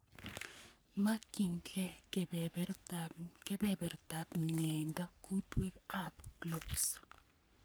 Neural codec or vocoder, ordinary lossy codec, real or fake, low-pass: codec, 44.1 kHz, 3.4 kbps, Pupu-Codec; none; fake; none